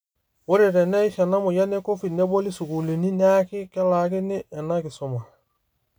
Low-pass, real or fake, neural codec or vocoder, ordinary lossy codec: none; real; none; none